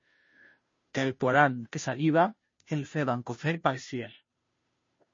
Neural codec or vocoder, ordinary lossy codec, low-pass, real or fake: codec, 16 kHz, 0.5 kbps, FunCodec, trained on Chinese and English, 25 frames a second; MP3, 32 kbps; 7.2 kHz; fake